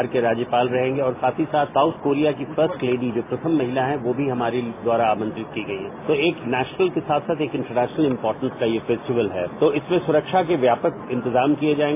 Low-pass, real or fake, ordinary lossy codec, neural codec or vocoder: 3.6 kHz; real; AAC, 24 kbps; none